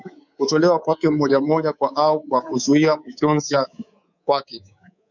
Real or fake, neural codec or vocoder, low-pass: fake; codec, 24 kHz, 3.1 kbps, DualCodec; 7.2 kHz